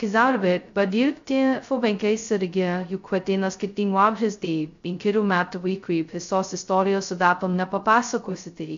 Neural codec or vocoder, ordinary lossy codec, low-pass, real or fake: codec, 16 kHz, 0.2 kbps, FocalCodec; AAC, 64 kbps; 7.2 kHz; fake